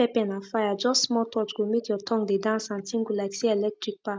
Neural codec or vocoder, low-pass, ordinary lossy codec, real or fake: none; none; none; real